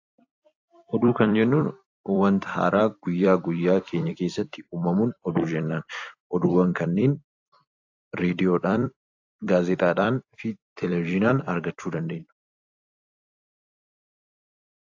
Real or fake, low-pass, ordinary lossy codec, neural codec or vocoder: fake; 7.2 kHz; AAC, 48 kbps; vocoder, 44.1 kHz, 128 mel bands every 256 samples, BigVGAN v2